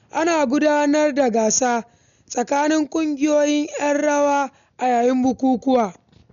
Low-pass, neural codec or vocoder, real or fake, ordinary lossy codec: 7.2 kHz; none; real; none